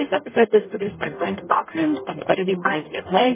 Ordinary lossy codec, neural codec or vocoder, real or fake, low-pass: MP3, 16 kbps; codec, 44.1 kHz, 0.9 kbps, DAC; fake; 3.6 kHz